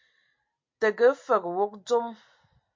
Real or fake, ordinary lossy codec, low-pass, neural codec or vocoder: real; MP3, 48 kbps; 7.2 kHz; none